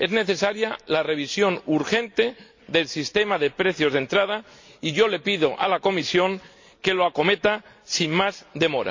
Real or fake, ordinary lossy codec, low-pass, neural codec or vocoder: real; MP3, 48 kbps; 7.2 kHz; none